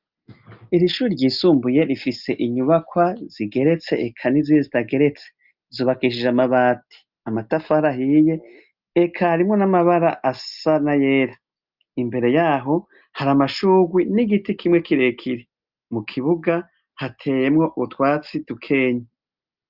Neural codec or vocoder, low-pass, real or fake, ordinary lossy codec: none; 5.4 kHz; real; Opus, 24 kbps